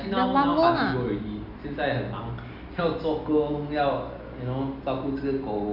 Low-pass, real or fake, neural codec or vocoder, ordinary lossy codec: 5.4 kHz; real; none; Opus, 64 kbps